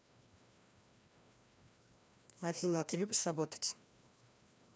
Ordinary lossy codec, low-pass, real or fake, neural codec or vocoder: none; none; fake; codec, 16 kHz, 1 kbps, FreqCodec, larger model